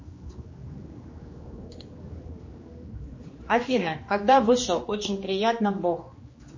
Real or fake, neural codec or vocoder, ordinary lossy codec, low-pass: fake; codec, 16 kHz, 2 kbps, X-Codec, HuBERT features, trained on general audio; MP3, 32 kbps; 7.2 kHz